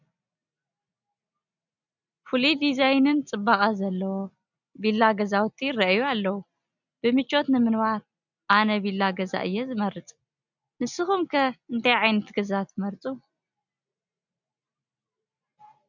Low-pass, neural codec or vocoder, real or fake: 7.2 kHz; none; real